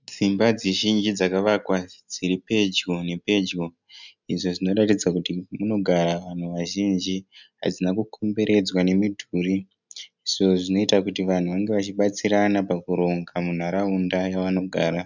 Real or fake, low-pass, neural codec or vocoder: real; 7.2 kHz; none